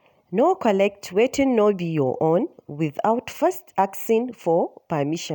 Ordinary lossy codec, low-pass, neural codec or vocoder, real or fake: none; none; none; real